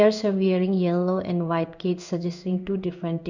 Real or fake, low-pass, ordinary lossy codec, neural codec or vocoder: fake; 7.2 kHz; none; codec, 16 kHz in and 24 kHz out, 1 kbps, XY-Tokenizer